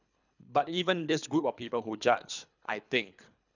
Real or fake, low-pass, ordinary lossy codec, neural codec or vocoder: fake; 7.2 kHz; none; codec, 24 kHz, 3 kbps, HILCodec